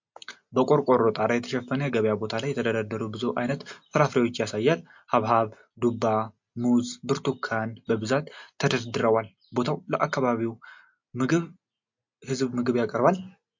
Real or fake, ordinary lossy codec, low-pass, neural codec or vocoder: real; MP3, 48 kbps; 7.2 kHz; none